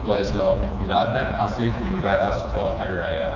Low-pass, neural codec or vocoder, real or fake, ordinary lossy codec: 7.2 kHz; codec, 16 kHz, 2 kbps, FreqCodec, smaller model; fake; none